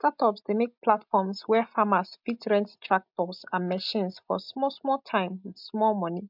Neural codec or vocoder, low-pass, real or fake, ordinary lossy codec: none; 5.4 kHz; real; MP3, 48 kbps